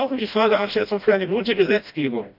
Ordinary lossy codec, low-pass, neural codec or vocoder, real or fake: none; 5.4 kHz; codec, 16 kHz, 1 kbps, FreqCodec, smaller model; fake